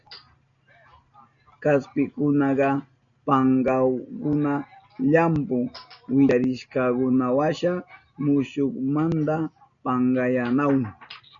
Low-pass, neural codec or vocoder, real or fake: 7.2 kHz; none; real